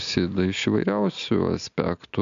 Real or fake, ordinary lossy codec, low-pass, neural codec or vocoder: real; MP3, 64 kbps; 7.2 kHz; none